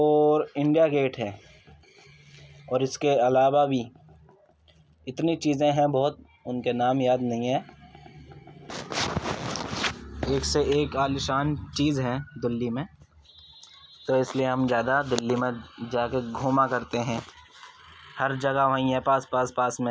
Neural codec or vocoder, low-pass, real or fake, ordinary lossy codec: none; none; real; none